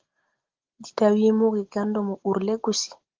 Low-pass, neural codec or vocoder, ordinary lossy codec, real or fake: 7.2 kHz; none; Opus, 16 kbps; real